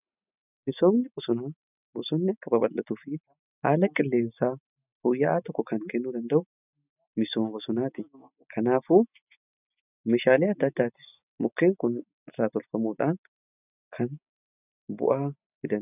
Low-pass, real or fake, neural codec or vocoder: 3.6 kHz; real; none